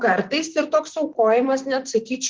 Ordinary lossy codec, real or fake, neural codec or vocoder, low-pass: Opus, 16 kbps; fake; vocoder, 44.1 kHz, 128 mel bands, Pupu-Vocoder; 7.2 kHz